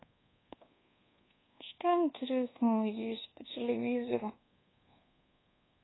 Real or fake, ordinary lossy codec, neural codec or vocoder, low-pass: fake; AAC, 16 kbps; codec, 24 kHz, 1.2 kbps, DualCodec; 7.2 kHz